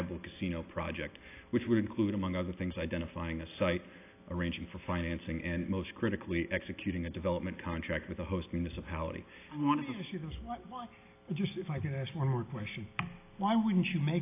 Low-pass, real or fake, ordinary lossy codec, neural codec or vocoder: 3.6 kHz; real; AAC, 24 kbps; none